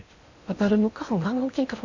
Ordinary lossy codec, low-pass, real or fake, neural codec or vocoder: Opus, 64 kbps; 7.2 kHz; fake; codec, 16 kHz in and 24 kHz out, 0.8 kbps, FocalCodec, streaming, 65536 codes